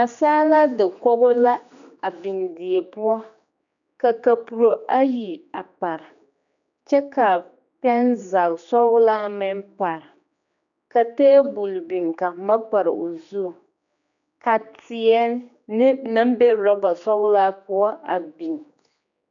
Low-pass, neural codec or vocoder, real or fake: 7.2 kHz; codec, 16 kHz, 2 kbps, X-Codec, HuBERT features, trained on general audio; fake